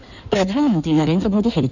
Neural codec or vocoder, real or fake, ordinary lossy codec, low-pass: codec, 16 kHz in and 24 kHz out, 1.1 kbps, FireRedTTS-2 codec; fake; none; 7.2 kHz